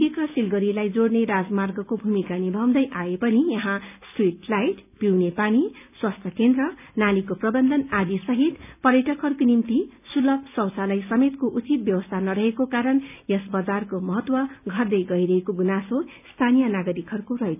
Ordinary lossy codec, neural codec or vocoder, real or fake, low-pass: none; none; real; 3.6 kHz